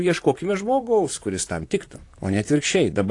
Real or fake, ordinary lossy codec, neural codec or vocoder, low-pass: fake; AAC, 48 kbps; vocoder, 44.1 kHz, 128 mel bands, Pupu-Vocoder; 10.8 kHz